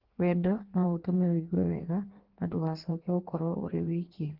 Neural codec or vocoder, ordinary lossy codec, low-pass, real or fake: codec, 16 kHz in and 24 kHz out, 1.1 kbps, FireRedTTS-2 codec; Opus, 16 kbps; 5.4 kHz; fake